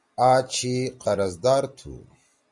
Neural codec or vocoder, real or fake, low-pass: none; real; 10.8 kHz